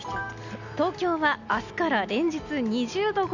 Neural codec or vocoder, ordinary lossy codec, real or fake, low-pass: none; none; real; 7.2 kHz